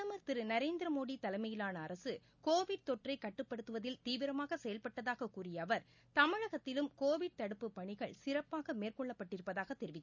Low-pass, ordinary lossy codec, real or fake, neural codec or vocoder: 7.2 kHz; none; real; none